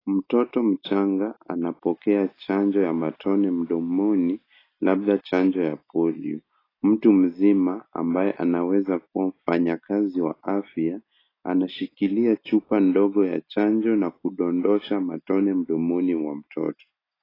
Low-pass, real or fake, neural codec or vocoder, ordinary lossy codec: 5.4 kHz; real; none; AAC, 24 kbps